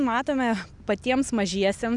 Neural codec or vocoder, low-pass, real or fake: none; 10.8 kHz; real